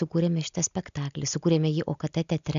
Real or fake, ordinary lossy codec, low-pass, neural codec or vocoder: real; MP3, 64 kbps; 7.2 kHz; none